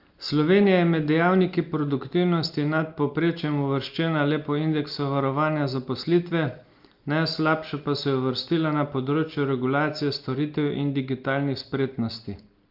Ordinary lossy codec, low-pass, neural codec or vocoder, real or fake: Opus, 32 kbps; 5.4 kHz; none; real